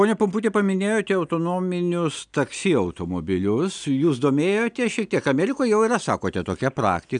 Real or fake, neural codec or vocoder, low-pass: real; none; 9.9 kHz